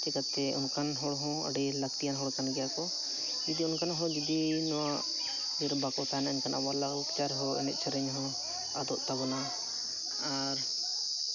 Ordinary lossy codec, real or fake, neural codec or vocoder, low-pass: none; real; none; 7.2 kHz